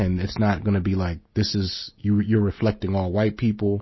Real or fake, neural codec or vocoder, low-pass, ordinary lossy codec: real; none; 7.2 kHz; MP3, 24 kbps